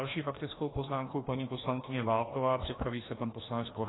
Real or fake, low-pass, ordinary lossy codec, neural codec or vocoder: fake; 7.2 kHz; AAC, 16 kbps; codec, 16 kHz, 1 kbps, FreqCodec, larger model